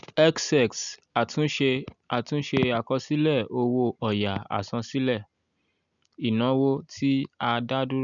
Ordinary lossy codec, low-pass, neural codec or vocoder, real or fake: none; 7.2 kHz; none; real